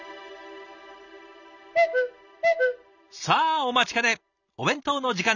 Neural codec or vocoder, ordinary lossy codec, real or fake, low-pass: none; none; real; 7.2 kHz